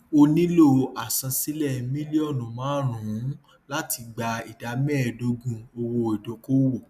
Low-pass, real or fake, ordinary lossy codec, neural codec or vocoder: 14.4 kHz; real; none; none